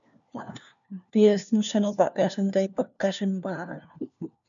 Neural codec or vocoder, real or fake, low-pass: codec, 16 kHz, 1 kbps, FunCodec, trained on LibriTTS, 50 frames a second; fake; 7.2 kHz